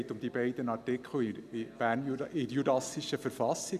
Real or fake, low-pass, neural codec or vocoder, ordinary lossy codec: real; 14.4 kHz; none; none